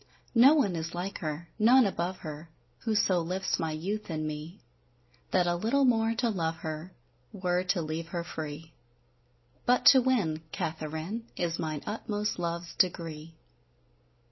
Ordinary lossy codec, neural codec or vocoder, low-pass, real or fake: MP3, 24 kbps; none; 7.2 kHz; real